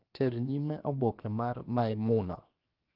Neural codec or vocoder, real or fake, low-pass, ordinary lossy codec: codec, 16 kHz, 0.7 kbps, FocalCodec; fake; 5.4 kHz; Opus, 32 kbps